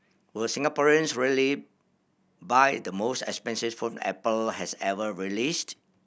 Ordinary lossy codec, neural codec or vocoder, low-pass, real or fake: none; none; none; real